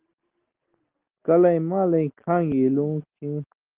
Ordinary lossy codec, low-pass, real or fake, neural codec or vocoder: Opus, 16 kbps; 3.6 kHz; real; none